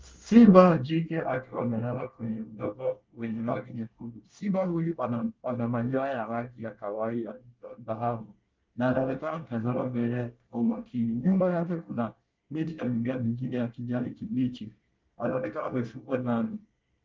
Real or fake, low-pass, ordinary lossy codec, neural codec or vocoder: fake; 7.2 kHz; Opus, 32 kbps; codec, 24 kHz, 1 kbps, SNAC